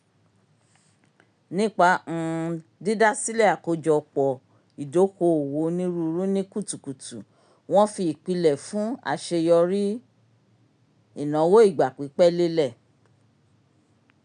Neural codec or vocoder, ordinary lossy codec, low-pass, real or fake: none; none; 9.9 kHz; real